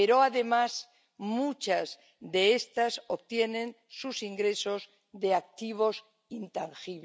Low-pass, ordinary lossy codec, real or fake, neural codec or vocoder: none; none; real; none